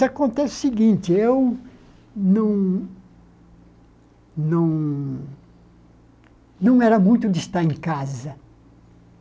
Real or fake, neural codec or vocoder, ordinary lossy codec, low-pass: real; none; none; none